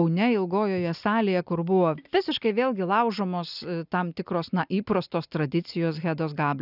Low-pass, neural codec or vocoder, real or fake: 5.4 kHz; none; real